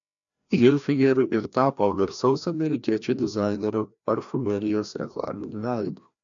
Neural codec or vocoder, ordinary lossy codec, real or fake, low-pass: codec, 16 kHz, 1 kbps, FreqCodec, larger model; MP3, 96 kbps; fake; 7.2 kHz